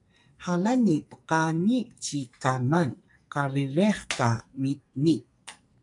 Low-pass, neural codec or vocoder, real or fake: 10.8 kHz; codec, 44.1 kHz, 2.6 kbps, SNAC; fake